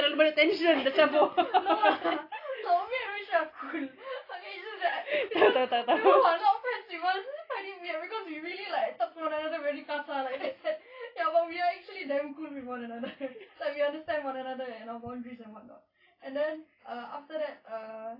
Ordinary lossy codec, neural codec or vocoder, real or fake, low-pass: AAC, 24 kbps; none; real; 5.4 kHz